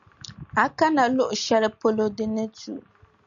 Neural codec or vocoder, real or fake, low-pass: none; real; 7.2 kHz